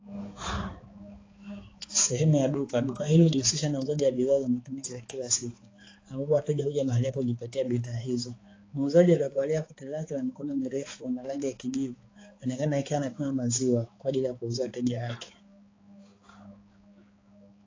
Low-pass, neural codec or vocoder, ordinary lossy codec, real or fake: 7.2 kHz; codec, 16 kHz, 4 kbps, X-Codec, HuBERT features, trained on balanced general audio; AAC, 32 kbps; fake